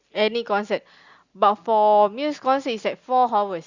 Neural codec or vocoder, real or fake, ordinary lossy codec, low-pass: none; real; Opus, 64 kbps; 7.2 kHz